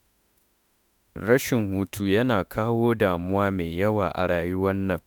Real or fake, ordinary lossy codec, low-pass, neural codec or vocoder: fake; none; none; autoencoder, 48 kHz, 32 numbers a frame, DAC-VAE, trained on Japanese speech